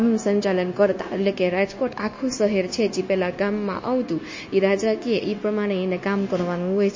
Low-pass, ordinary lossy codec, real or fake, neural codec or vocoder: 7.2 kHz; MP3, 32 kbps; fake; codec, 16 kHz, 0.9 kbps, LongCat-Audio-Codec